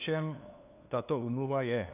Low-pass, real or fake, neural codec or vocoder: 3.6 kHz; fake; codec, 16 kHz, 2 kbps, FunCodec, trained on LibriTTS, 25 frames a second